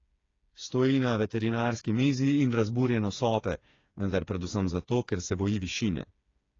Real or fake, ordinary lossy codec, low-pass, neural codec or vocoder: fake; AAC, 32 kbps; 7.2 kHz; codec, 16 kHz, 4 kbps, FreqCodec, smaller model